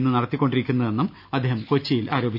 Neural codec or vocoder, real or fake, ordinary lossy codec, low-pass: none; real; none; 5.4 kHz